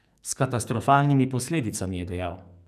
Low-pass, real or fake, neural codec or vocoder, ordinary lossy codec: 14.4 kHz; fake; codec, 44.1 kHz, 2.6 kbps, SNAC; none